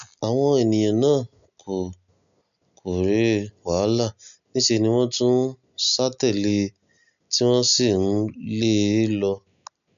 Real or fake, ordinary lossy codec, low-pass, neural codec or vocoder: real; none; 7.2 kHz; none